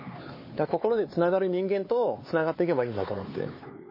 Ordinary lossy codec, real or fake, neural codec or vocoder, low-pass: MP3, 24 kbps; fake; codec, 16 kHz, 4 kbps, X-Codec, HuBERT features, trained on LibriSpeech; 5.4 kHz